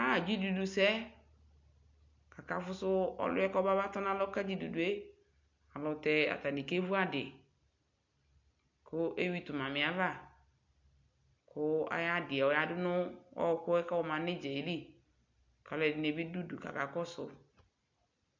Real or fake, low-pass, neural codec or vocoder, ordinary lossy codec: real; 7.2 kHz; none; MP3, 64 kbps